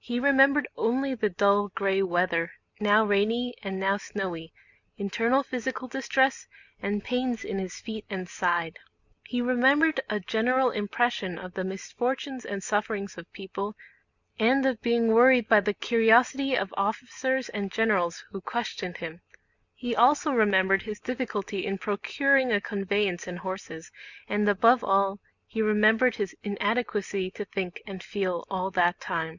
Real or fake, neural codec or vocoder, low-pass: real; none; 7.2 kHz